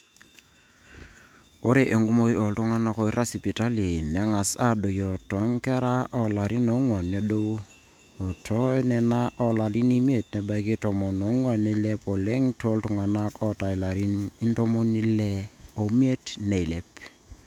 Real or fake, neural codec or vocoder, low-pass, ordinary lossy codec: fake; autoencoder, 48 kHz, 128 numbers a frame, DAC-VAE, trained on Japanese speech; 19.8 kHz; MP3, 96 kbps